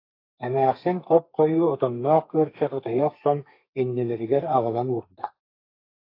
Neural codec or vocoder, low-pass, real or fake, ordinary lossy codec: codec, 32 kHz, 1.9 kbps, SNAC; 5.4 kHz; fake; AAC, 32 kbps